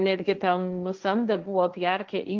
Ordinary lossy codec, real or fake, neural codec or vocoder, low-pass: Opus, 32 kbps; fake; codec, 16 kHz, 1.1 kbps, Voila-Tokenizer; 7.2 kHz